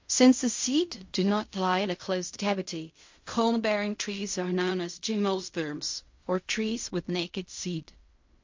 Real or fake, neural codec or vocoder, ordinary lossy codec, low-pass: fake; codec, 16 kHz in and 24 kHz out, 0.4 kbps, LongCat-Audio-Codec, fine tuned four codebook decoder; MP3, 48 kbps; 7.2 kHz